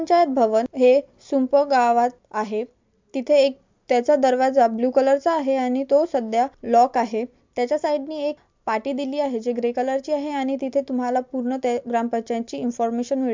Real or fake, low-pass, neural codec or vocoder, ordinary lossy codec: real; 7.2 kHz; none; MP3, 64 kbps